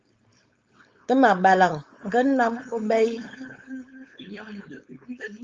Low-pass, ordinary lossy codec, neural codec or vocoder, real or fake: 7.2 kHz; Opus, 32 kbps; codec, 16 kHz, 4.8 kbps, FACodec; fake